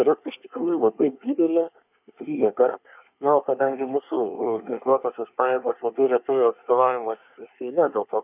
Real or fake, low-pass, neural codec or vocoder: fake; 3.6 kHz; codec, 24 kHz, 1 kbps, SNAC